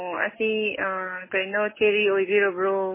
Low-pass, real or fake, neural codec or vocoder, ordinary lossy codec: 3.6 kHz; real; none; MP3, 16 kbps